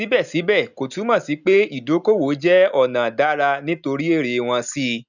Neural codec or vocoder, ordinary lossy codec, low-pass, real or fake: none; none; 7.2 kHz; real